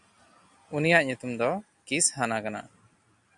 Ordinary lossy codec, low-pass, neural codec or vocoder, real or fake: MP3, 64 kbps; 10.8 kHz; none; real